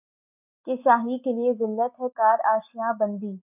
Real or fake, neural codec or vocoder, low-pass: real; none; 3.6 kHz